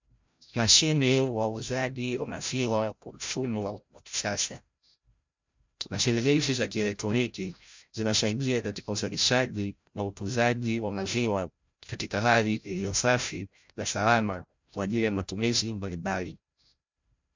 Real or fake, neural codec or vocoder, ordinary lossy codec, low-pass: fake; codec, 16 kHz, 0.5 kbps, FreqCodec, larger model; MP3, 64 kbps; 7.2 kHz